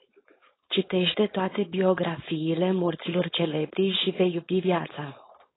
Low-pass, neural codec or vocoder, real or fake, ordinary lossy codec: 7.2 kHz; codec, 16 kHz, 4.8 kbps, FACodec; fake; AAC, 16 kbps